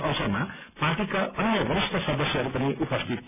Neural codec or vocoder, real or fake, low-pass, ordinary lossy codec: none; real; 3.6 kHz; none